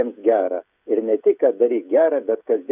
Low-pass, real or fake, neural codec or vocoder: 3.6 kHz; fake; codec, 16 kHz, 16 kbps, FreqCodec, smaller model